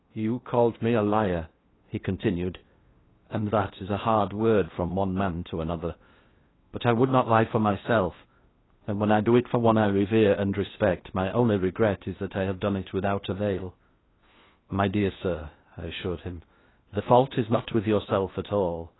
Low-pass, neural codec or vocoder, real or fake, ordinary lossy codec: 7.2 kHz; codec, 16 kHz in and 24 kHz out, 0.8 kbps, FocalCodec, streaming, 65536 codes; fake; AAC, 16 kbps